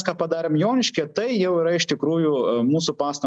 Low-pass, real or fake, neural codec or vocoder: 9.9 kHz; real; none